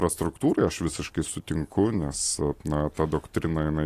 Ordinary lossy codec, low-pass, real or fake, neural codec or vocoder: AAC, 48 kbps; 14.4 kHz; real; none